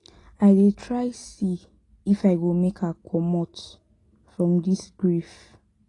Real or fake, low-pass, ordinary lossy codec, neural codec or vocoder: real; 10.8 kHz; AAC, 32 kbps; none